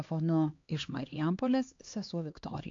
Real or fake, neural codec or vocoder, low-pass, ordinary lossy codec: fake; codec, 16 kHz, 2 kbps, X-Codec, HuBERT features, trained on LibriSpeech; 7.2 kHz; AAC, 48 kbps